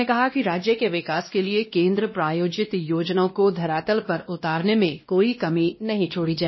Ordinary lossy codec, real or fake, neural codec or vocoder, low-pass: MP3, 24 kbps; fake; codec, 16 kHz, 1 kbps, X-Codec, WavLM features, trained on Multilingual LibriSpeech; 7.2 kHz